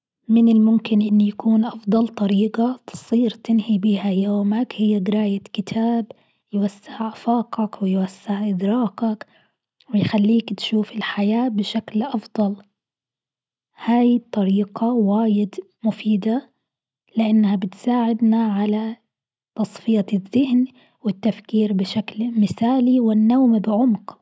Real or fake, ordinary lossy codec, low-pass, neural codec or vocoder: real; none; none; none